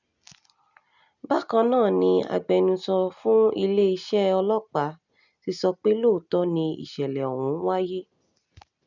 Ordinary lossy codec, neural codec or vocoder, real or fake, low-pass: none; none; real; 7.2 kHz